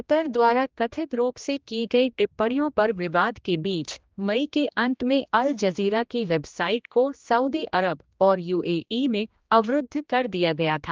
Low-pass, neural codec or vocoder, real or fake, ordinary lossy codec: 7.2 kHz; codec, 16 kHz, 1 kbps, X-Codec, HuBERT features, trained on general audio; fake; Opus, 24 kbps